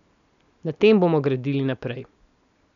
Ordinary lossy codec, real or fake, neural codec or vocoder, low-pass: none; real; none; 7.2 kHz